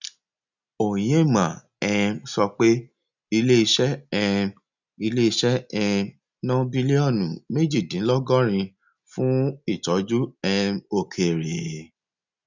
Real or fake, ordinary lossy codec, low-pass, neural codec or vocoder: real; none; 7.2 kHz; none